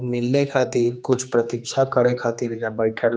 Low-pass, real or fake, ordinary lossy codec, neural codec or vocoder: none; fake; none; codec, 16 kHz, 2 kbps, X-Codec, HuBERT features, trained on general audio